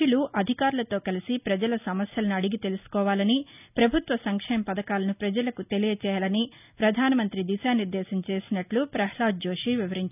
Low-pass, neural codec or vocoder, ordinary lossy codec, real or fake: 3.6 kHz; none; none; real